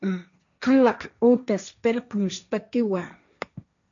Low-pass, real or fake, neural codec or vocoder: 7.2 kHz; fake; codec, 16 kHz, 1.1 kbps, Voila-Tokenizer